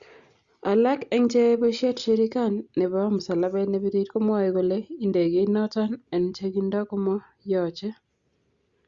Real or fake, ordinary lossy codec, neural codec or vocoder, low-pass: real; Opus, 64 kbps; none; 7.2 kHz